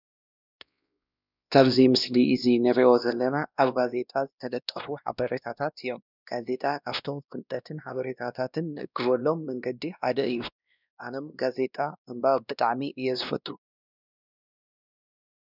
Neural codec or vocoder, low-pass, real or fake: codec, 16 kHz, 1 kbps, X-Codec, WavLM features, trained on Multilingual LibriSpeech; 5.4 kHz; fake